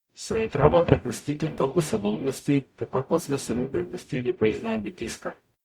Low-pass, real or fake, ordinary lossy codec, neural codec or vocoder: 19.8 kHz; fake; Opus, 64 kbps; codec, 44.1 kHz, 0.9 kbps, DAC